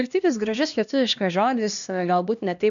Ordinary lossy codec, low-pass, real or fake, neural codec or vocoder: MP3, 96 kbps; 7.2 kHz; fake; codec, 16 kHz, 1 kbps, X-Codec, HuBERT features, trained on LibriSpeech